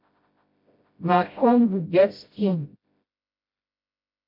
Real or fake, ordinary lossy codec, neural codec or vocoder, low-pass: fake; MP3, 32 kbps; codec, 16 kHz, 0.5 kbps, FreqCodec, smaller model; 5.4 kHz